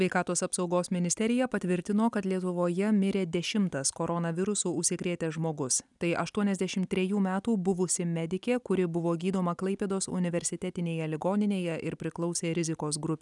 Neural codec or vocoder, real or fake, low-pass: none; real; 10.8 kHz